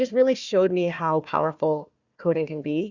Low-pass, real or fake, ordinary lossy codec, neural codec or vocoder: 7.2 kHz; fake; Opus, 64 kbps; codec, 16 kHz, 1 kbps, FunCodec, trained on Chinese and English, 50 frames a second